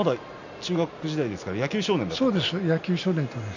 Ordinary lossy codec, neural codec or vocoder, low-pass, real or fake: none; none; 7.2 kHz; real